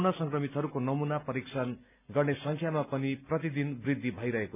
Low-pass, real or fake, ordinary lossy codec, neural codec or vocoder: 3.6 kHz; real; none; none